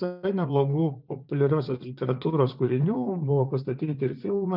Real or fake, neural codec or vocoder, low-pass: fake; vocoder, 44.1 kHz, 80 mel bands, Vocos; 5.4 kHz